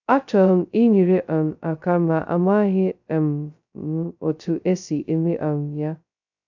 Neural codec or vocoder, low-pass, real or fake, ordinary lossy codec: codec, 16 kHz, 0.2 kbps, FocalCodec; 7.2 kHz; fake; none